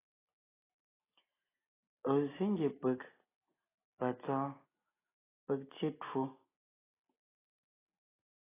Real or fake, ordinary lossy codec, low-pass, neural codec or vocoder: real; AAC, 16 kbps; 3.6 kHz; none